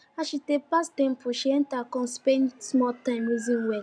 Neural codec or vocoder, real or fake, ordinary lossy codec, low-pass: none; real; none; 9.9 kHz